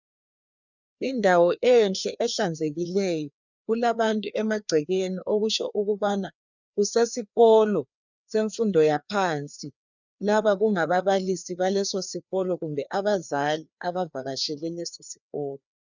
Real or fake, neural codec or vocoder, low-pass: fake; codec, 16 kHz, 2 kbps, FreqCodec, larger model; 7.2 kHz